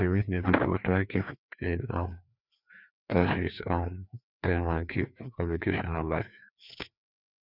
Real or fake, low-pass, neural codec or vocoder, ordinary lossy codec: fake; 5.4 kHz; codec, 16 kHz, 2 kbps, FreqCodec, larger model; none